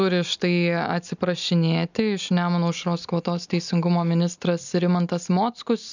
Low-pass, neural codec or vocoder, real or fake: 7.2 kHz; none; real